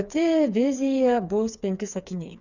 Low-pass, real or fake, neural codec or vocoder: 7.2 kHz; fake; codec, 16 kHz, 4 kbps, FreqCodec, smaller model